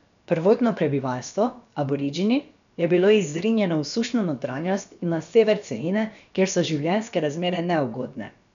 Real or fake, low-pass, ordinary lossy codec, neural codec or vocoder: fake; 7.2 kHz; none; codec, 16 kHz, about 1 kbps, DyCAST, with the encoder's durations